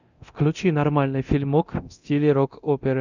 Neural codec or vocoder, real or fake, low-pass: codec, 24 kHz, 0.9 kbps, DualCodec; fake; 7.2 kHz